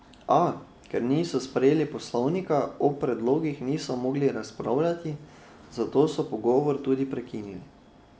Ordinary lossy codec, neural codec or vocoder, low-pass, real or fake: none; none; none; real